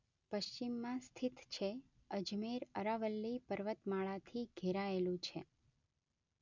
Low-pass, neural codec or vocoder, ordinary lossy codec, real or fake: 7.2 kHz; none; none; real